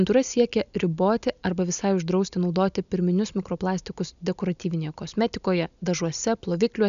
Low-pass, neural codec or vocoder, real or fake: 7.2 kHz; none; real